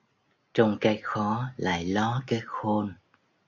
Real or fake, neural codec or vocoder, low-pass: real; none; 7.2 kHz